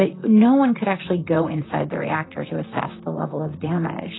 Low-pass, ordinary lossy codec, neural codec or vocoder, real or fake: 7.2 kHz; AAC, 16 kbps; vocoder, 44.1 kHz, 128 mel bands, Pupu-Vocoder; fake